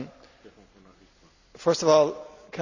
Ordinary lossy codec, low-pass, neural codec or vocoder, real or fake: none; 7.2 kHz; none; real